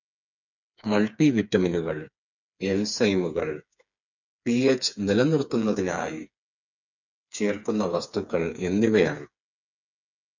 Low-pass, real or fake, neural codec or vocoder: 7.2 kHz; fake; codec, 16 kHz, 4 kbps, FreqCodec, smaller model